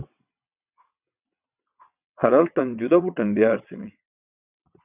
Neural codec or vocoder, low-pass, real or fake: vocoder, 22.05 kHz, 80 mel bands, WaveNeXt; 3.6 kHz; fake